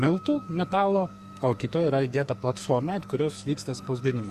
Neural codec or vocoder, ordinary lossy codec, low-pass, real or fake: codec, 44.1 kHz, 2.6 kbps, SNAC; Opus, 64 kbps; 14.4 kHz; fake